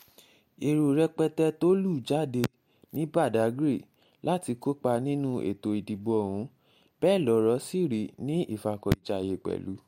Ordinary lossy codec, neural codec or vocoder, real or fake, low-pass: MP3, 64 kbps; none; real; 19.8 kHz